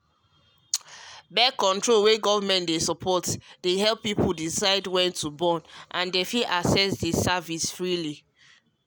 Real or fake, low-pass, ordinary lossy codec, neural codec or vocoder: real; none; none; none